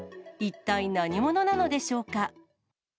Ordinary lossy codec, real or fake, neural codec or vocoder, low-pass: none; real; none; none